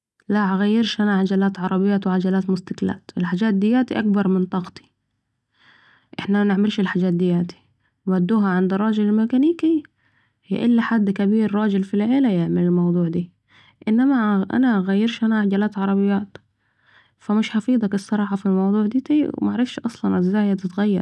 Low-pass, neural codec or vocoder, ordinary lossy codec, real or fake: none; none; none; real